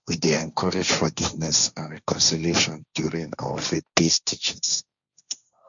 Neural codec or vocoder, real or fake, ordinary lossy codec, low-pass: codec, 16 kHz, 1.1 kbps, Voila-Tokenizer; fake; none; 7.2 kHz